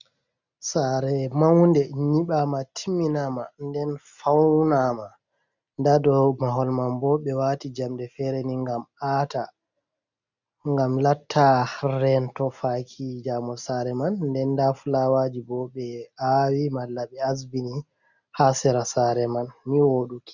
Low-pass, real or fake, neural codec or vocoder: 7.2 kHz; real; none